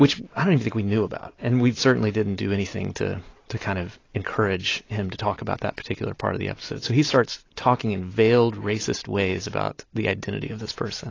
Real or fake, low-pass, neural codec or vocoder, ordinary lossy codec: real; 7.2 kHz; none; AAC, 32 kbps